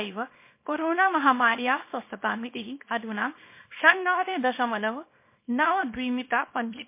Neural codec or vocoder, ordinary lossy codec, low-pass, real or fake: codec, 24 kHz, 0.9 kbps, WavTokenizer, small release; MP3, 24 kbps; 3.6 kHz; fake